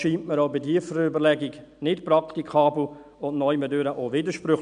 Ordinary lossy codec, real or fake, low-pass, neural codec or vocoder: none; real; 9.9 kHz; none